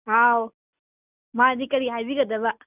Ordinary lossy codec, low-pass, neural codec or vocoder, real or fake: none; 3.6 kHz; none; real